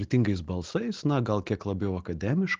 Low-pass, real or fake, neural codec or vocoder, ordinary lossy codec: 7.2 kHz; real; none; Opus, 16 kbps